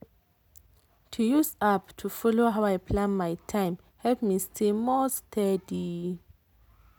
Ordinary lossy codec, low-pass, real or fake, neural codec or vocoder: none; none; real; none